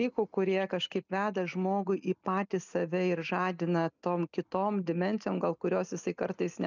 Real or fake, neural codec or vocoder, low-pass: real; none; 7.2 kHz